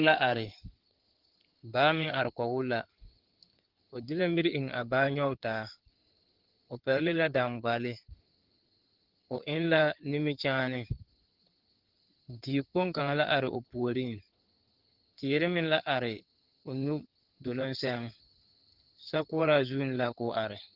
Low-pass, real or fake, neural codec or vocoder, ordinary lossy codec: 5.4 kHz; fake; codec, 16 kHz in and 24 kHz out, 2.2 kbps, FireRedTTS-2 codec; Opus, 16 kbps